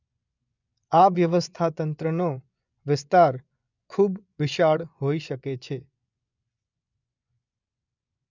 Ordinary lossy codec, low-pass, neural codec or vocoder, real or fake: none; 7.2 kHz; vocoder, 24 kHz, 100 mel bands, Vocos; fake